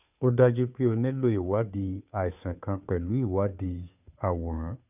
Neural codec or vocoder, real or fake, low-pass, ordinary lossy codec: codec, 16 kHz, 2 kbps, FunCodec, trained on Chinese and English, 25 frames a second; fake; 3.6 kHz; none